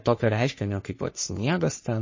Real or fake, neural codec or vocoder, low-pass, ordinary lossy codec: fake; codec, 44.1 kHz, 3.4 kbps, Pupu-Codec; 7.2 kHz; MP3, 32 kbps